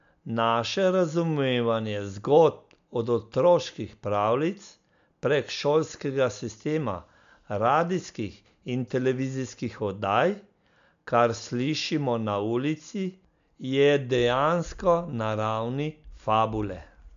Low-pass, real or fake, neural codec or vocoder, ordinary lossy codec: 7.2 kHz; real; none; MP3, 64 kbps